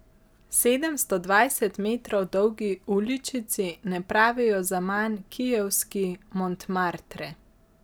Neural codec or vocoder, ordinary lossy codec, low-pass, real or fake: none; none; none; real